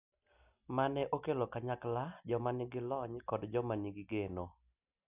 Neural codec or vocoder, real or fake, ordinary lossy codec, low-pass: none; real; none; 3.6 kHz